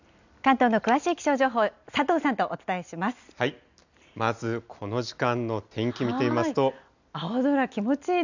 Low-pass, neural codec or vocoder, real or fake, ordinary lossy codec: 7.2 kHz; none; real; none